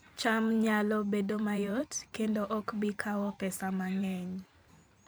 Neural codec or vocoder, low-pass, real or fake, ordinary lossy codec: vocoder, 44.1 kHz, 128 mel bands every 512 samples, BigVGAN v2; none; fake; none